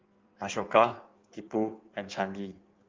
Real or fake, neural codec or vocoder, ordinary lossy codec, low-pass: fake; codec, 16 kHz in and 24 kHz out, 1.1 kbps, FireRedTTS-2 codec; Opus, 24 kbps; 7.2 kHz